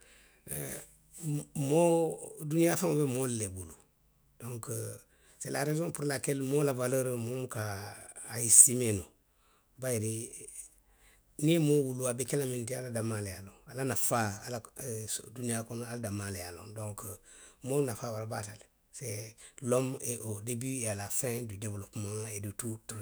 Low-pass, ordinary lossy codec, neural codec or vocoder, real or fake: none; none; autoencoder, 48 kHz, 128 numbers a frame, DAC-VAE, trained on Japanese speech; fake